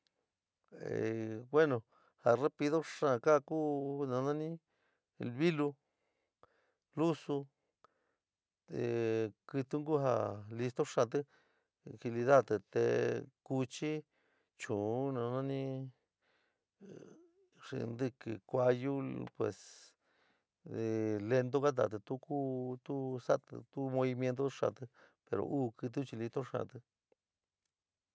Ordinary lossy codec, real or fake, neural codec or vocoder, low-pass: none; real; none; none